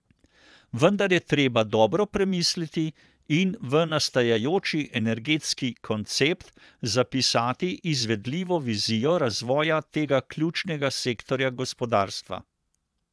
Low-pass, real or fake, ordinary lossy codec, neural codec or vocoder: none; fake; none; vocoder, 22.05 kHz, 80 mel bands, Vocos